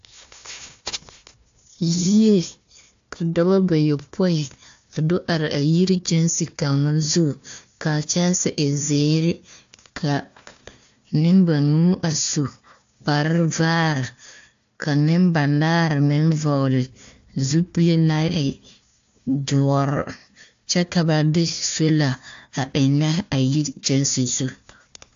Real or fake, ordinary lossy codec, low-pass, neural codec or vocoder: fake; AAC, 64 kbps; 7.2 kHz; codec, 16 kHz, 1 kbps, FunCodec, trained on Chinese and English, 50 frames a second